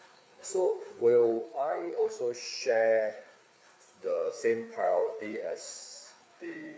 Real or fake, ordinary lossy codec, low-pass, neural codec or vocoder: fake; none; none; codec, 16 kHz, 4 kbps, FreqCodec, larger model